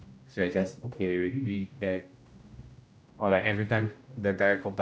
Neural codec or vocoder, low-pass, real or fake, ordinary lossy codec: codec, 16 kHz, 0.5 kbps, X-Codec, HuBERT features, trained on general audio; none; fake; none